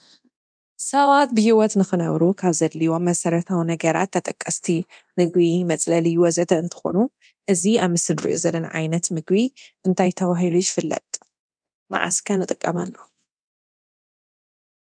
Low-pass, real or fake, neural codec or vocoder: 9.9 kHz; fake; codec, 24 kHz, 0.9 kbps, DualCodec